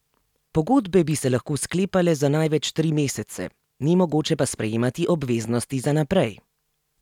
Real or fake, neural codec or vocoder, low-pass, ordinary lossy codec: real; none; 19.8 kHz; none